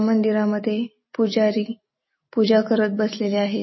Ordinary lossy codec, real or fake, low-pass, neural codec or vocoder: MP3, 24 kbps; real; 7.2 kHz; none